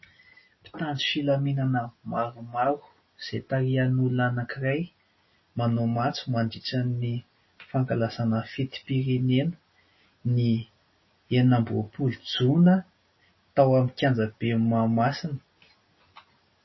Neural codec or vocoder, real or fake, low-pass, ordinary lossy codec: none; real; 7.2 kHz; MP3, 24 kbps